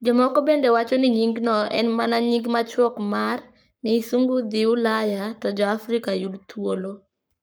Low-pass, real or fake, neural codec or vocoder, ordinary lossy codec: none; fake; codec, 44.1 kHz, 7.8 kbps, Pupu-Codec; none